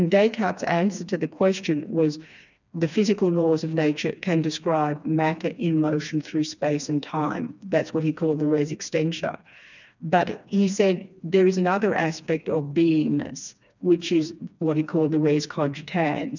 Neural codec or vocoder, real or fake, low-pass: codec, 16 kHz, 2 kbps, FreqCodec, smaller model; fake; 7.2 kHz